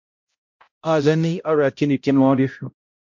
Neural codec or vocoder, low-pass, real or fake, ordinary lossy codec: codec, 16 kHz, 0.5 kbps, X-Codec, HuBERT features, trained on balanced general audio; 7.2 kHz; fake; MP3, 48 kbps